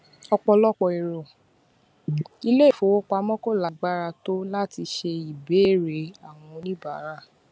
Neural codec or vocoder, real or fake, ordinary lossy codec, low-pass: none; real; none; none